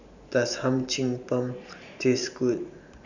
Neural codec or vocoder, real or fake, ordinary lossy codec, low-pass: vocoder, 44.1 kHz, 128 mel bands every 512 samples, BigVGAN v2; fake; none; 7.2 kHz